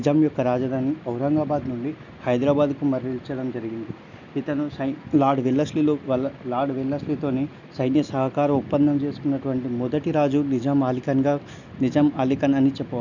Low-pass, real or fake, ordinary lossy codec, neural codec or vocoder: 7.2 kHz; real; none; none